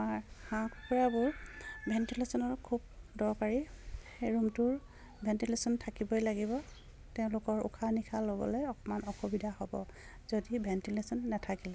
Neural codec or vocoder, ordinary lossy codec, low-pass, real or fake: none; none; none; real